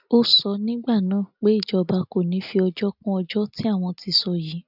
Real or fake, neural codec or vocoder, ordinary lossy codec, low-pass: real; none; none; 5.4 kHz